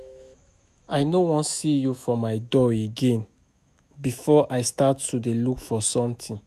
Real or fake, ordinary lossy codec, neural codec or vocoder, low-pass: fake; none; autoencoder, 48 kHz, 128 numbers a frame, DAC-VAE, trained on Japanese speech; 14.4 kHz